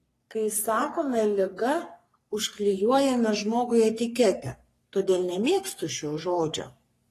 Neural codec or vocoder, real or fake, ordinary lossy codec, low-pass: codec, 44.1 kHz, 3.4 kbps, Pupu-Codec; fake; AAC, 48 kbps; 14.4 kHz